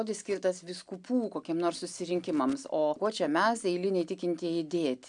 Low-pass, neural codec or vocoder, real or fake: 9.9 kHz; none; real